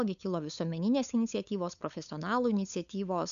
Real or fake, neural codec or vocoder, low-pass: fake; codec, 16 kHz, 8 kbps, FunCodec, trained on LibriTTS, 25 frames a second; 7.2 kHz